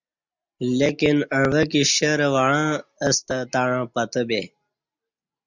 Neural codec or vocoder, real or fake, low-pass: none; real; 7.2 kHz